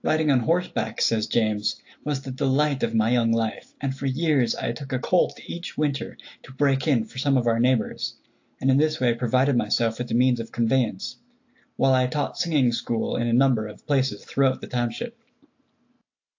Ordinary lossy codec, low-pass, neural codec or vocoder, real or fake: AAC, 48 kbps; 7.2 kHz; none; real